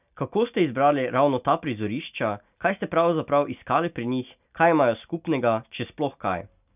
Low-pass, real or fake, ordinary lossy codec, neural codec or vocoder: 3.6 kHz; real; none; none